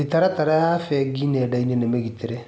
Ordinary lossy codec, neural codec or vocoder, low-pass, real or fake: none; none; none; real